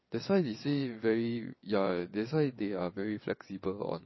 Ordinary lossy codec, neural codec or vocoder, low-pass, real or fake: MP3, 24 kbps; vocoder, 22.05 kHz, 80 mel bands, WaveNeXt; 7.2 kHz; fake